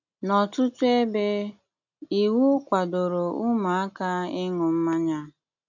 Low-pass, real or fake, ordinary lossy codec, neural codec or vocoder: 7.2 kHz; real; none; none